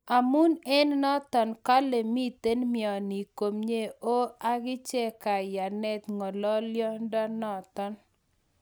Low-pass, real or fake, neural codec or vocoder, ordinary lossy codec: none; real; none; none